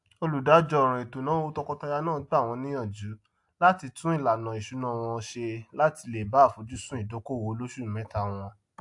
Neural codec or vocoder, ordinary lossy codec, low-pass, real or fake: none; AAC, 64 kbps; 10.8 kHz; real